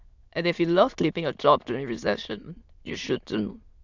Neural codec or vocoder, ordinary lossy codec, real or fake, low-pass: autoencoder, 22.05 kHz, a latent of 192 numbers a frame, VITS, trained on many speakers; Opus, 64 kbps; fake; 7.2 kHz